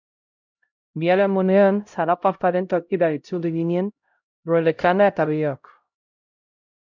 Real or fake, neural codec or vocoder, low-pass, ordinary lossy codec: fake; codec, 16 kHz, 0.5 kbps, X-Codec, HuBERT features, trained on LibriSpeech; 7.2 kHz; MP3, 64 kbps